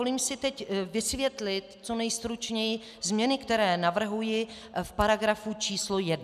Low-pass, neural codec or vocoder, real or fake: 14.4 kHz; none; real